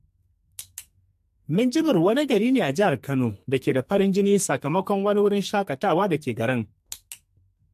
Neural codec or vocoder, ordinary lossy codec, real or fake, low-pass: codec, 44.1 kHz, 2.6 kbps, SNAC; MP3, 64 kbps; fake; 14.4 kHz